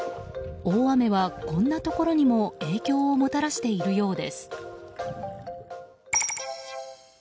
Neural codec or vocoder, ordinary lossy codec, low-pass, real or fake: none; none; none; real